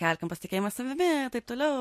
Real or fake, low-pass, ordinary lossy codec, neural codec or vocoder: real; 14.4 kHz; MP3, 64 kbps; none